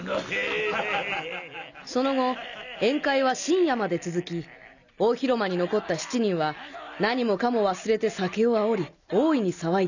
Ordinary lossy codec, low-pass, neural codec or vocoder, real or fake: none; 7.2 kHz; none; real